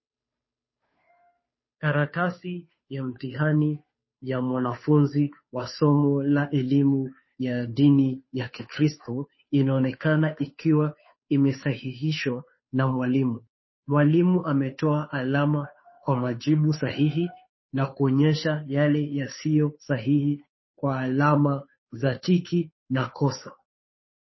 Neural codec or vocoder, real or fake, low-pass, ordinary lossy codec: codec, 16 kHz, 2 kbps, FunCodec, trained on Chinese and English, 25 frames a second; fake; 7.2 kHz; MP3, 24 kbps